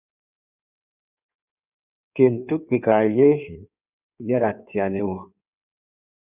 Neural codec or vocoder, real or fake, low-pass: codec, 16 kHz in and 24 kHz out, 1.1 kbps, FireRedTTS-2 codec; fake; 3.6 kHz